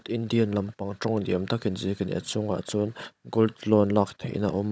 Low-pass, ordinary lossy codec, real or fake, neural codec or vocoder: none; none; fake; codec, 16 kHz, 16 kbps, FunCodec, trained on Chinese and English, 50 frames a second